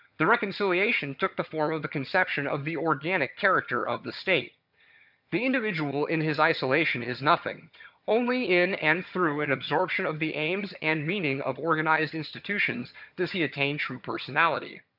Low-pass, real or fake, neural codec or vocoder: 5.4 kHz; fake; vocoder, 22.05 kHz, 80 mel bands, HiFi-GAN